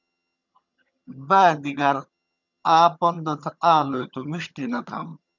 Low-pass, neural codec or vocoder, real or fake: 7.2 kHz; vocoder, 22.05 kHz, 80 mel bands, HiFi-GAN; fake